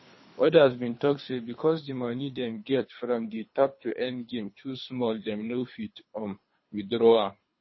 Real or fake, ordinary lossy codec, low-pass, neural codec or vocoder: fake; MP3, 24 kbps; 7.2 kHz; codec, 24 kHz, 3 kbps, HILCodec